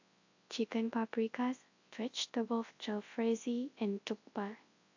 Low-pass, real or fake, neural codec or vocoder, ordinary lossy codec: 7.2 kHz; fake; codec, 24 kHz, 0.9 kbps, WavTokenizer, large speech release; AAC, 48 kbps